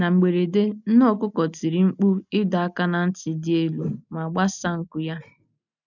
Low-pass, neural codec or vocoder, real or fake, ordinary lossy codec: 7.2 kHz; none; real; none